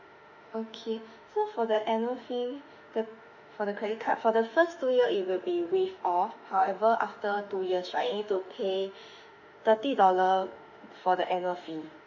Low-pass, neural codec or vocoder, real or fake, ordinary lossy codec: 7.2 kHz; autoencoder, 48 kHz, 32 numbers a frame, DAC-VAE, trained on Japanese speech; fake; none